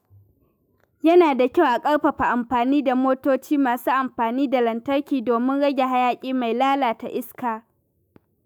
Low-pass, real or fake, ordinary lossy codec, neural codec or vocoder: none; fake; none; autoencoder, 48 kHz, 128 numbers a frame, DAC-VAE, trained on Japanese speech